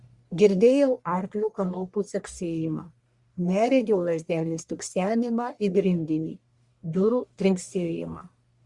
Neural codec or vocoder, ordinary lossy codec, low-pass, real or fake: codec, 44.1 kHz, 1.7 kbps, Pupu-Codec; Opus, 64 kbps; 10.8 kHz; fake